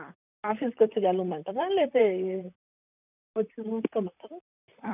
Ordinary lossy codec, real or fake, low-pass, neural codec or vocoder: none; fake; 3.6 kHz; vocoder, 44.1 kHz, 128 mel bands, Pupu-Vocoder